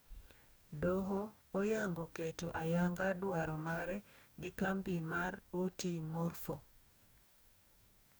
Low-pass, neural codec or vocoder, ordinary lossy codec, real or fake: none; codec, 44.1 kHz, 2.6 kbps, DAC; none; fake